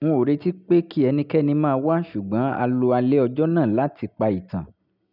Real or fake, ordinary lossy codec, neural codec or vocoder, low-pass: real; none; none; 5.4 kHz